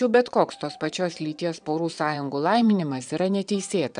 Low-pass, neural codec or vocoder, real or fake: 9.9 kHz; vocoder, 22.05 kHz, 80 mel bands, Vocos; fake